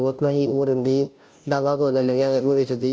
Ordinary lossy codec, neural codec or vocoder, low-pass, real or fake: none; codec, 16 kHz, 0.5 kbps, FunCodec, trained on Chinese and English, 25 frames a second; none; fake